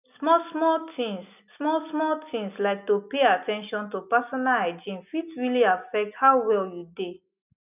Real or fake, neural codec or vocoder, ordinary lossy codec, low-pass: real; none; none; 3.6 kHz